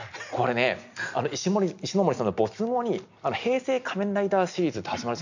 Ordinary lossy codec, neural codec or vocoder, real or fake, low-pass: none; none; real; 7.2 kHz